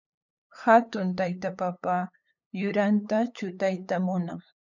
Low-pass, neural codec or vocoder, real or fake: 7.2 kHz; codec, 16 kHz, 8 kbps, FunCodec, trained on LibriTTS, 25 frames a second; fake